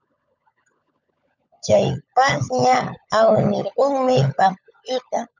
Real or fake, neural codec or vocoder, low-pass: fake; codec, 16 kHz, 16 kbps, FunCodec, trained on LibriTTS, 50 frames a second; 7.2 kHz